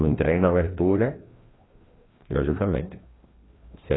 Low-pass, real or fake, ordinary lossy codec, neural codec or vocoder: 7.2 kHz; fake; AAC, 16 kbps; codec, 16 kHz, 1 kbps, FreqCodec, larger model